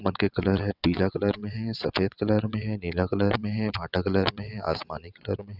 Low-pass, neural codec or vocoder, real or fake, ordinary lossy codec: 5.4 kHz; vocoder, 22.05 kHz, 80 mel bands, WaveNeXt; fake; none